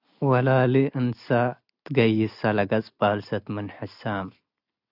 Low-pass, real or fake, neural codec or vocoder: 5.4 kHz; real; none